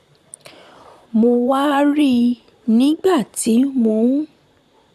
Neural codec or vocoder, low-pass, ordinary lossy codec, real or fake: vocoder, 44.1 kHz, 128 mel bands, Pupu-Vocoder; 14.4 kHz; none; fake